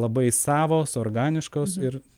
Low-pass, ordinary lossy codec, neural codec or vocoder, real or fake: 19.8 kHz; Opus, 32 kbps; none; real